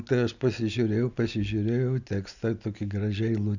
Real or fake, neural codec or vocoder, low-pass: real; none; 7.2 kHz